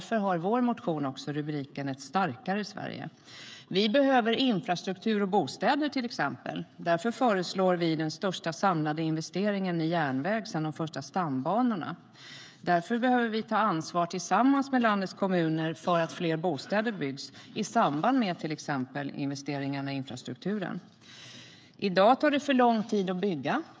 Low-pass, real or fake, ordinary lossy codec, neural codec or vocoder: none; fake; none; codec, 16 kHz, 16 kbps, FreqCodec, smaller model